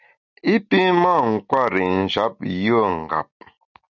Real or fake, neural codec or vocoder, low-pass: real; none; 7.2 kHz